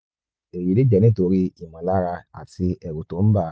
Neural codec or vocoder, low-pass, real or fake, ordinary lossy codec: none; none; real; none